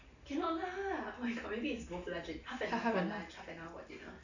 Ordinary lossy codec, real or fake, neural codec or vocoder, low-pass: none; real; none; 7.2 kHz